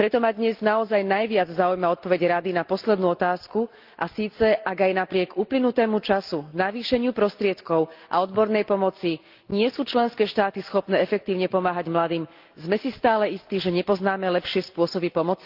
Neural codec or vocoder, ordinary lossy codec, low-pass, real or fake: none; Opus, 32 kbps; 5.4 kHz; real